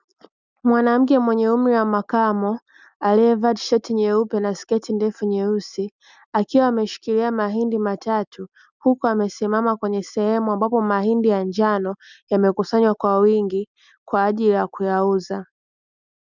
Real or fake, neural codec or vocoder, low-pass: real; none; 7.2 kHz